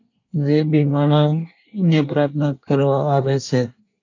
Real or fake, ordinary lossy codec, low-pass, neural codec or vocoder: fake; AAC, 48 kbps; 7.2 kHz; codec, 24 kHz, 1 kbps, SNAC